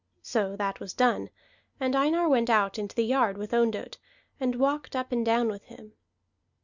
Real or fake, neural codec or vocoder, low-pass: real; none; 7.2 kHz